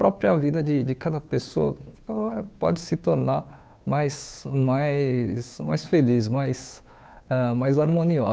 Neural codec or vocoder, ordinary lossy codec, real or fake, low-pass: codec, 16 kHz, 2 kbps, FunCodec, trained on Chinese and English, 25 frames a second; none; fake; none